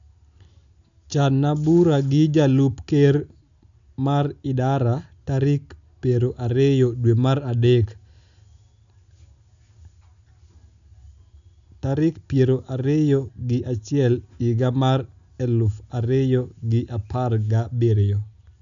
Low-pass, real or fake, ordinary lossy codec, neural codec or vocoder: 7.2 kHz; real; none; none